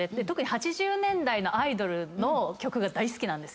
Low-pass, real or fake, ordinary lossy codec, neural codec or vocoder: none; real; none; none